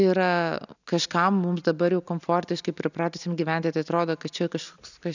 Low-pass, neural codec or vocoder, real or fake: 7.2 kHz; none; real